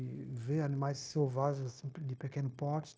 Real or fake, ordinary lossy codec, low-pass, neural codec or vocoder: fake; none; none; codec, 16 kHz, 0.9 kbps, LongCat-Audio-Codec